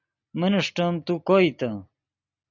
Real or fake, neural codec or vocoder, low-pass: real; none; 7.2 kHz